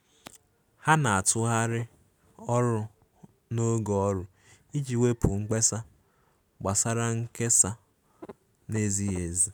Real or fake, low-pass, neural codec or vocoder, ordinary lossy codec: real; none; none; none